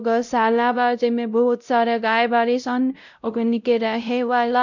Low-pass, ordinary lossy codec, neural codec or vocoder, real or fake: 7.2 kHz; none; codec, 16 kHz, 0.5 kbps, X-Codec, WavLM features, trained on Multilingual LibriSpeech; fake